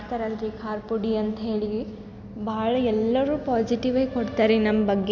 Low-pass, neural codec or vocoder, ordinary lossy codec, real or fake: 7.2 kHz; none; none; real